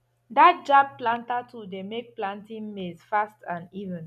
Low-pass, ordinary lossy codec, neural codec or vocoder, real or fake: 14.4 kHz; none; none; real